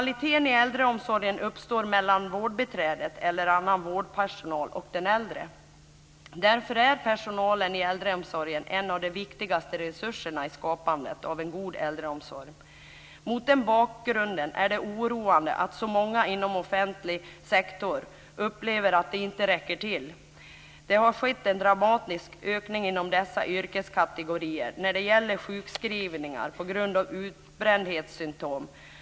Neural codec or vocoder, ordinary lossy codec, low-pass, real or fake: none; none; none; real